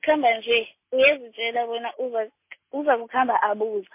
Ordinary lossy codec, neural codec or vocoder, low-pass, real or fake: MP3, 24 kbps; none; 3.6 kHz; real